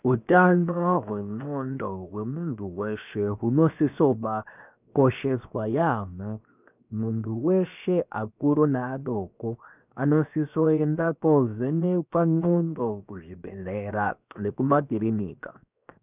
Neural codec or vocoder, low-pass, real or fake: codec, 16 kHz, 0.7 kbps, FocalCodec; 3.6 kHz; fake